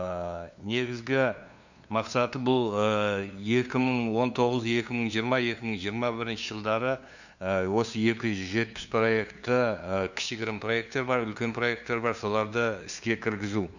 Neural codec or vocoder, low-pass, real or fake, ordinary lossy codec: codec, 16 kHz, 2 kbps, FunCodec, trained on LibriTTS, 25 frames a second; 7.2 kHz; fake; none